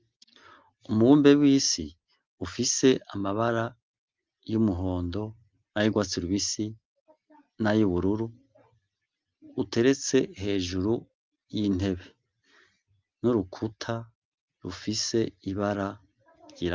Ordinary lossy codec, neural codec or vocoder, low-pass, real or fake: Opus, 24 kbps; none; 7.2 kHz; real